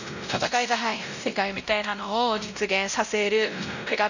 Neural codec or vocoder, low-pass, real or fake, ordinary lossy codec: codec, 16 kHz, 0.5 kbps, X-Codec, WavLM features, trained on Multilingual LibriSpeech; 7.2 kHz; fake; none